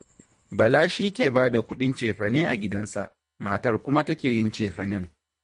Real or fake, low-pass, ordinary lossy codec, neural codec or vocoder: fake; 10.8 kHz; MP3, 48 kbps; codec, 24 kHz, 1.5 kbps, HILCodec